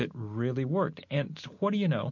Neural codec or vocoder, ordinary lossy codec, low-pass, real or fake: none; MP3, 48 kbps; 7.2 kHz; real